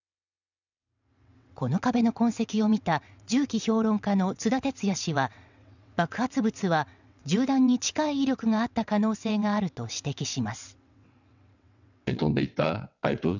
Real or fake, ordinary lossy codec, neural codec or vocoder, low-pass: fake; none; vocoder, 22.05 kHz, 80 mel bands, WaveNeXt; 7.2 kHz